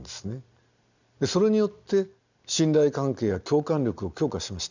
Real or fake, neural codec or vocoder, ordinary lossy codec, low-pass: real; none; none; 7.2 kHz